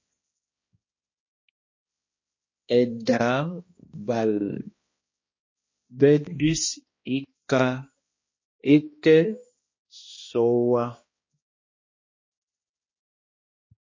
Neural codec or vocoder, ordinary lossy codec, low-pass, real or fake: codec, 16 kHz, 1 kbps, X-Codec, HuBERT features, trained on balanced general audio; MP3, 32 kbps; 7.2 kHz; fake